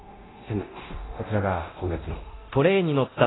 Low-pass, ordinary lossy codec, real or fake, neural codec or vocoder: 7.2 kHz; AAC, 16 kbps; fake; codec, 24 kHz, 0.9 kbps, DualCodec